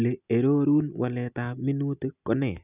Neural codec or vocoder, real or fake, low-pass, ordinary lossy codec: none; real; 3.6 kHz; none